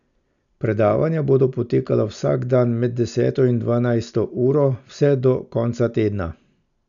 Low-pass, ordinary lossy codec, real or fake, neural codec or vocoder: 7.2 kHz; none; real; none